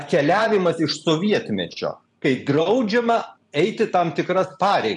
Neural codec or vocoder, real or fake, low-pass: vocoder, 44.1 kHz, 128 mel bands every 512 samples, BigVGAN v2; fake; 10.8 kHz